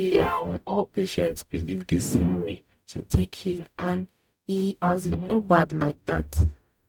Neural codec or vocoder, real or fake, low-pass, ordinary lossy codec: codec, 44.1 kHz, 0.9 kbps, DAC; fake; 19.8 kHz; none